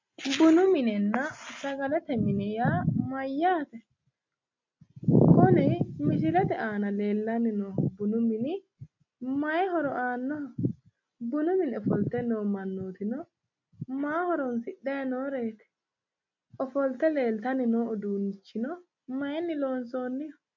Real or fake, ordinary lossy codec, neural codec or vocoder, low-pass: real; MP3, 48 kbps; none; 7.2 kHz